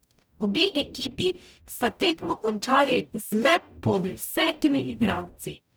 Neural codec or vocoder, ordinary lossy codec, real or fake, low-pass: codec, 44.1 kHz, 0.9 kbps, DAC; none; fake; none